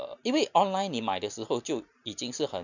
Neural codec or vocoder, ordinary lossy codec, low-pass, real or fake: none; none; 7.2 kHz; real